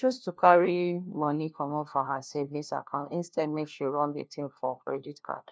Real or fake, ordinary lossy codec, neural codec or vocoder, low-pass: fake; none; codec, 16 kHz, 1 kbps, FunCodec, trained on LibriTTS, 50 frames a second; none